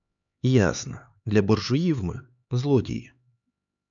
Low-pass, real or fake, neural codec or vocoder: 7.2 kHz; fake; codec, 16 kHz, 4 kbps, X-Codec, HuBERT features, trained on LibriSpeech